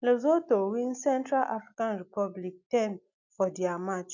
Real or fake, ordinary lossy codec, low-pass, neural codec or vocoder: real; none; 7.2 kHz; none